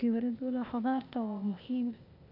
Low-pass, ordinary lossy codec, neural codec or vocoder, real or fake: 5.4 kHz; none; codec, 16 kHz, 0.8 kbps, ZipCodec; fake